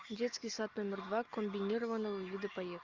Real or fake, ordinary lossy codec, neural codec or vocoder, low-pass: real; Opus, 24 kbps; none; 7.2 kHz